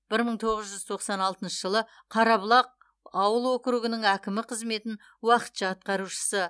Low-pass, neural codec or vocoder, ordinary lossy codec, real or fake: none; none; none; real